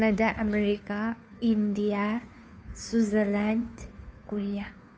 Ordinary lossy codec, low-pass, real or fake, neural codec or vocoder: none; none; fake; codec, 16 kHz, 2 kbps, FunCodec, trained on Chinese and English, 25 frames a second